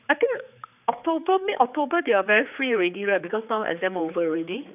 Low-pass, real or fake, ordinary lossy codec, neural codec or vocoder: 3.6 kHz; fake; none; codec, 16 kHz, 4 kbps, X-Codec, HuBERT features, trained on general audio